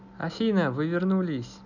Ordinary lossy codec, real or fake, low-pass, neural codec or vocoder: none; real; 7.2 kHz; none